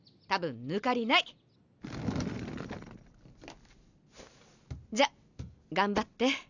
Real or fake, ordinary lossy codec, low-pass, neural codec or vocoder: real; none; 7.2 kHz; none